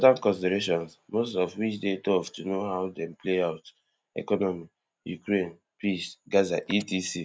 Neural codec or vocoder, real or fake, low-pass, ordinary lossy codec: none; real; none; none